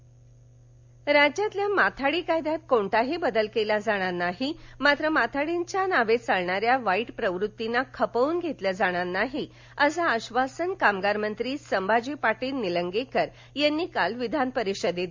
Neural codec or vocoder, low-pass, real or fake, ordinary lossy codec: none; 7.2 kHz; real; MP3, 64 kbps